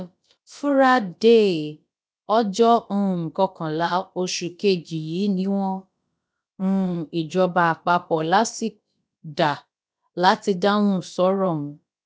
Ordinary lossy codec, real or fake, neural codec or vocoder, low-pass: none; fake; codec, 16 kHz, about 1 kbps, DyCAST, with the encoder's durations; none